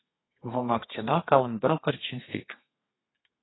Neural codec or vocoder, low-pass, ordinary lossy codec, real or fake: codec, 32 kHz, 1.9 kbps, SNAC; 7.2 kHz; AAC, 16 kbps; fake